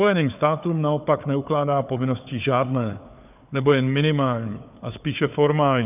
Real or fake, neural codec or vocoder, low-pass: fake; codec, 16 kHz, 4 kbps, FunCodec, trained on Chinese and English, 50 frames a second; 3.6 kHz